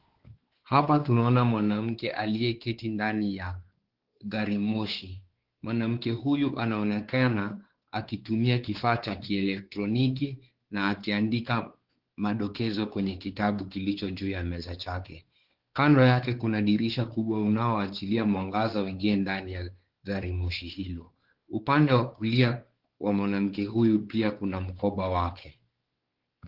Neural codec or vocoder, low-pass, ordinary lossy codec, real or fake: codec, 16 kHz, 4 kbps, X-Codec, WavLM features, trained on Multilingual LibriSpeech; 5.4 kHz; Opus, 16 kbps; fake